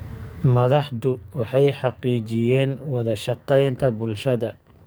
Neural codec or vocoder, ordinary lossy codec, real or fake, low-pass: codec, 44.1 kHz, 2.6 kbps, SNAC; none; fake; none